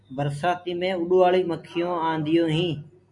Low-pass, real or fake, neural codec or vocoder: 10.8 kHz; real; none